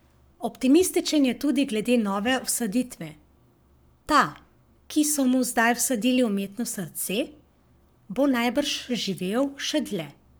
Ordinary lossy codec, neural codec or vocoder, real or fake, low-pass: none; codec, 44.1 kHz, 7.8 kbps, Pupu-Codec; fake; none